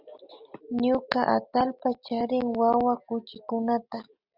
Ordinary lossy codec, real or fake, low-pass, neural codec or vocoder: Opus, 64 kbps; real; 5.4 kHz; none